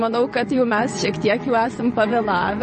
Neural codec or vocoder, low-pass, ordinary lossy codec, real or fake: vocoder, 22.05 kHz, 80 mel bands, WaveNeXt; 9.9 kHz; MP3, 32 kbps; fake